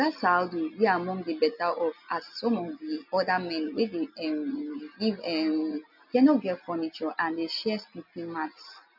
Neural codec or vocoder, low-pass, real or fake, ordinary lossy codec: none; 5.4 kHz; real; none